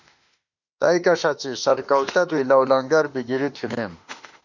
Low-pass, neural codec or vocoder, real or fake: 7.2 kHz; autoencoder, 48 kHz, 32 numbers a frame, DAC-VAE, trained on Japanese speech; fake